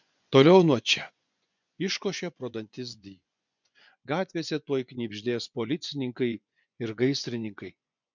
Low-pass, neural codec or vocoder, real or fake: 7.2 kHz; vocoder, 24 kHz, 100 mel bands, Vocos; fake